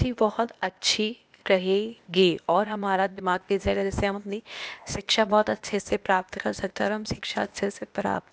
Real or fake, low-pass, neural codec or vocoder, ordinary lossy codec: fake; none; codec, 16 kHz, 0.8 kbps, ZipCodec; none